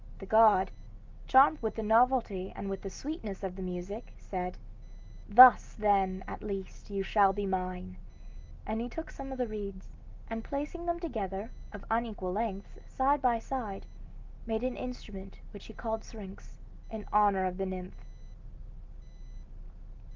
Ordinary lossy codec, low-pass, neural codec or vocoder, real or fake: Opus, 32 kbps; 7.2 kHz; none; real